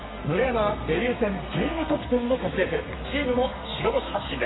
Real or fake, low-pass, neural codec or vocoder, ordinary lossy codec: fake; 7.2 kHz; codec, 32 kHz, 1.9 kbps, SNAC; AAC, 16 kbps